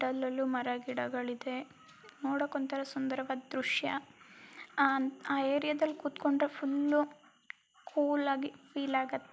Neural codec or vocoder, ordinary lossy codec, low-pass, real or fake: none; none; none; real